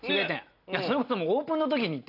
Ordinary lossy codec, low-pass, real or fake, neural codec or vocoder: AAC, 32 kbps; 5.4 kHz; real; none